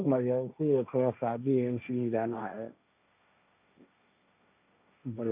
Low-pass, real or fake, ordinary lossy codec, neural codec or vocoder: 3.6 kHz; fake; none; codec, 16 kHz, 1.1 kbps, Voila-Tokenizer